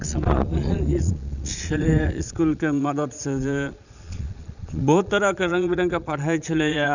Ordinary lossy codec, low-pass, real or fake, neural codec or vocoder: none; 7.2 kHz; fake; vocoder, 22.05 kHz, 80 mel bands, Vocos